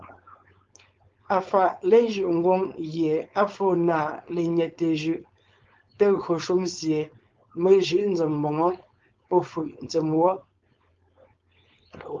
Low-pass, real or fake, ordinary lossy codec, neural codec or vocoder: 7.2 kHz; fake; Opus, 32 kbps; codec, 16 kHz, 4.8 kbps, FACodec